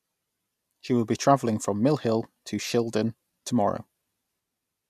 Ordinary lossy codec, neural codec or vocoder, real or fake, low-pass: none; none; real; 14.4 kHz